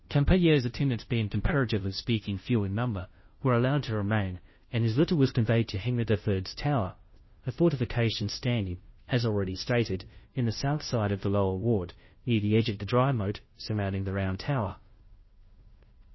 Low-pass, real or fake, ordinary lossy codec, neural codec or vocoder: 7.2 kHz; fake; MP3, 24 kbps; codec, 16 kHz, 0.5 kbps, FunCodec, trained on Chinese and English, 25 frames a second